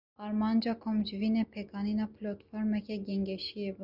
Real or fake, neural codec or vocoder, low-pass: real; none; 5.4 kHz